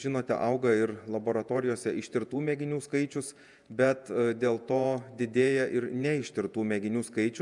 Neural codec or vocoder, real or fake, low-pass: vocoder, 48 kHz, 128 mel bands, Vocos; fake; 10.8 kHz